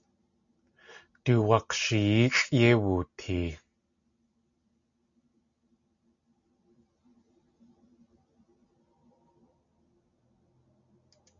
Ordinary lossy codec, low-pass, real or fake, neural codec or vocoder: MP3, 64 kbps; 7.2 kHz; real; none